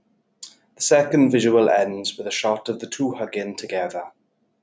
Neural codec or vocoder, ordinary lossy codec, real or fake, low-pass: none; none; real; none